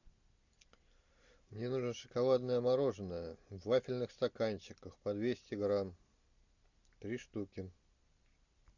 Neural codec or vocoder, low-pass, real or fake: none; 7.2 kHz; real